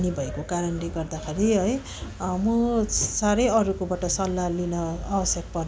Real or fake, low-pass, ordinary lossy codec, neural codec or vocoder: real; none; none; none